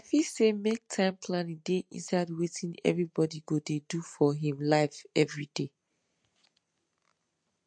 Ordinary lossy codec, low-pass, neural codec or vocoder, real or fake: MP3, 48 kbps; 9.9 kHz; none; real